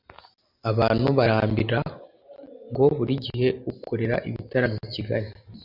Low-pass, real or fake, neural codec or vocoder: 5.4 kHz; real; none